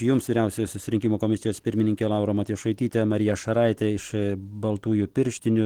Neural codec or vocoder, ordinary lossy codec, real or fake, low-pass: none; Opus, 16 kbps; real; 19.8 kHz